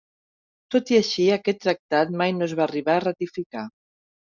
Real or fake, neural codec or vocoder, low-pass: real; none; 7.2 kHz